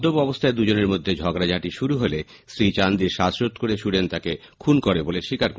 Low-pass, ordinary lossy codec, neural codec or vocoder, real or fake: none; none; none; real